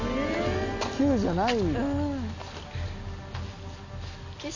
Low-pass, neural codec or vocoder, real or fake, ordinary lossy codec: 7.2 kHz; none; real; none